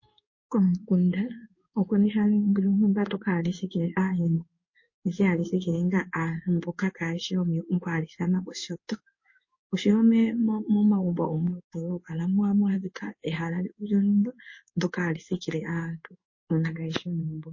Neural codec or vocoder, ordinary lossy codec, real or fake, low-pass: codec, 16 kHz in and 24 kHz out, 1 kbps, XY-Tokenizer; MP3, 32 kbps; fake; 7.2 kHz